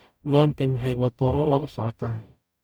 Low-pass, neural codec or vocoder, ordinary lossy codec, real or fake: none; codec, 44.1 kHz, 0.9 kbps, DAC; none; fake